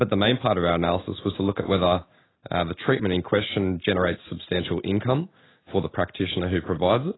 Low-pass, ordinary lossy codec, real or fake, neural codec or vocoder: 7.2 kHz; AAC, 16 kbps; real; none